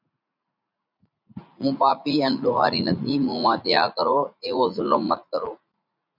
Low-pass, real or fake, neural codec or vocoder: 5.4 kHz; fake; vocoder, 44.1 kHz, 80 mel bands, Vocos